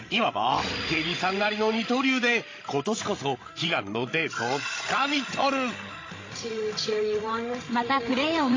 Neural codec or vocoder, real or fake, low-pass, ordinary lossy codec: codec, 16 kHz, 16 kbps, FreqCodec, larger model; fake; 7.2 kHz; AAC, 32 kbps